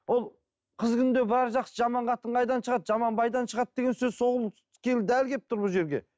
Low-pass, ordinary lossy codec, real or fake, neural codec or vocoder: none; none; real; none